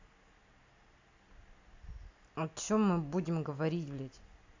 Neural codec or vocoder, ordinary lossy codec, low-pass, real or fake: none; AAC, 48 kbps; 7.2 kHz; real